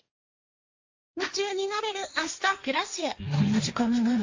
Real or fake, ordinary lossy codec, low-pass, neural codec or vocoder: fake; AAC, 48 kbps; 7.2 kHz; codec, 16 kHz, 1.1 kbps, Voila-Tokenizer